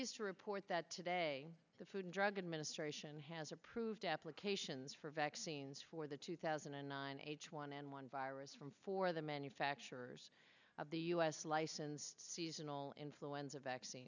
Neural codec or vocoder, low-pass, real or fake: none; 7.2 kHz; real